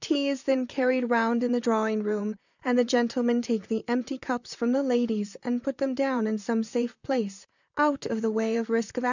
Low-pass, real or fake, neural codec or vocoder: 7.2 kHz; fake; vocoder, 44.1 kHz, 128 mel bands, Pupu-Vocoder